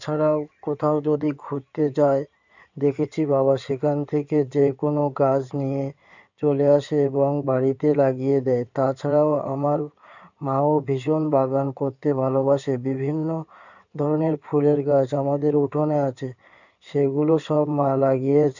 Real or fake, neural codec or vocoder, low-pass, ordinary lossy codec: fake; codec, 16 kHz in and 24 kHz out, 2.2 kbps, FireRedTTS-2 codec; 7.2 kHz; none